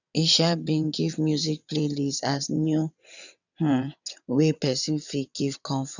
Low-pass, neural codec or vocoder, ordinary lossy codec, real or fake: 7.2 kHz; vocoder, 22.05 kHz, 80 mel bands, WaveNeXt; none; fake